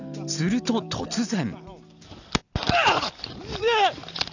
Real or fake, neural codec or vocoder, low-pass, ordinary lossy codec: real; none; 7.2 kHz; none